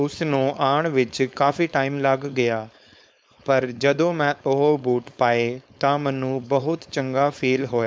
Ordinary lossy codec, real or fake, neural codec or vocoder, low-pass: none; fake; codec, 16 kHz, 4.8 kbps, FACodec; none